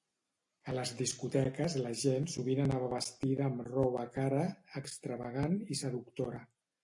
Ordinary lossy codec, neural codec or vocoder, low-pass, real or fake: AAC, 64 kbps; none; 10.8 kHz; real